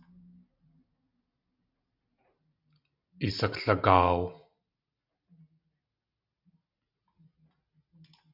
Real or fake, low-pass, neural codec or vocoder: real; 5.4 kHz; none